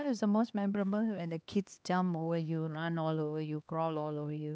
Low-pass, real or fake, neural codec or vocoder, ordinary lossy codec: none; fake; codec, 16 kHz, 2 kbps, X-Codec, HuBERT features, trained on LibriSpeech; none